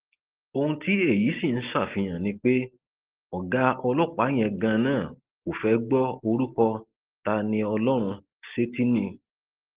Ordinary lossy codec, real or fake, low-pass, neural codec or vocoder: Opus, 16 kbps; real; 3.6 kHz; none